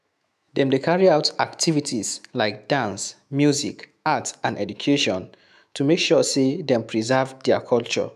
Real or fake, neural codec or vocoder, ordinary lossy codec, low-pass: fake; autoencoder, 48 kHz, 128 numbers a frame, DAC-VAE, trained on Japanese speech; none; 14.4 kHz